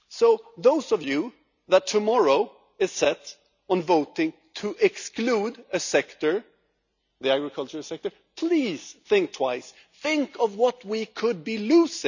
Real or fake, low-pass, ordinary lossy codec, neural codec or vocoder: real; 7.2 kHz; none; none